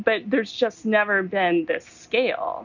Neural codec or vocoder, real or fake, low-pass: none; real; 7.2 kHz